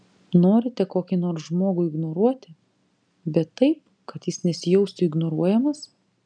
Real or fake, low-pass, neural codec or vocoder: real; 9.9 kHz; none